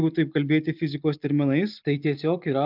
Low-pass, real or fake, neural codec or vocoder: 5.4 kHz; real; none